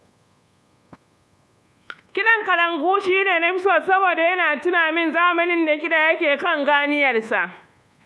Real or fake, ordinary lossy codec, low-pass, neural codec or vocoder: fake; none; none; codec, 24 kHz, 1.2 kbps, DualCodec